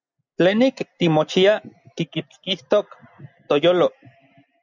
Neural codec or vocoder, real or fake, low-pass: none; real; 7.2 kHz